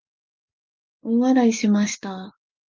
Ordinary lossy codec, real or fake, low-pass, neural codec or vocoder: Opus, 32 kbps; real; 7.2 kHz; none